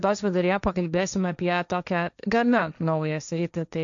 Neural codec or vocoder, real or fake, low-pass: codec, 16 kHz, 1.1 kbps, Voila-Tokenizer; fake; 7.2 kHz